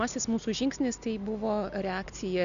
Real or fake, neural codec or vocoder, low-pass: real; none; 7.2 kHz